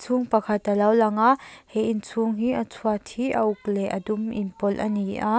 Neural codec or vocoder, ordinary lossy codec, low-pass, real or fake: none; none; none; real